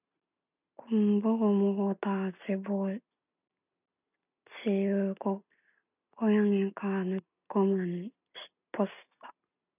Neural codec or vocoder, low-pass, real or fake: none; 3.6 kHz; real